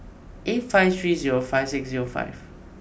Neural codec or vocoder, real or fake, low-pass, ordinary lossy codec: none; real; none; none